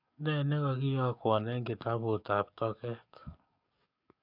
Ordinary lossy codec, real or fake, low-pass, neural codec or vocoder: none; fake; 5.4 kHz; codec, 44.1 kHz, 7.8 kbps, DAC